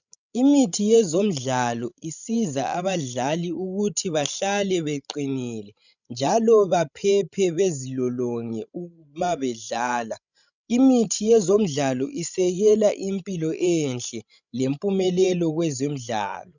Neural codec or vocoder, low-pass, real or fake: codec, 16 kHz, 16 kbps, FreqCodec, larger model; 7.2 kHz; fake